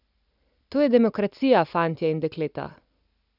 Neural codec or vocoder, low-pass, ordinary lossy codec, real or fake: none; 5.4 kHz; none; real